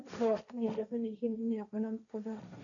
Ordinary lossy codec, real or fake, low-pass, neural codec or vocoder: none; fake; 7.2 kHz; codec, 16 kHz, 1.1 kbps, Voila-Tokenizer